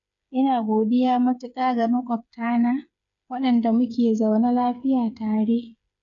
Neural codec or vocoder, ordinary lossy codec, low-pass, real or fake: codec, 16 kHz, 8 kbps, FreqCodec, smaller model; none; 7.2 kHz; fake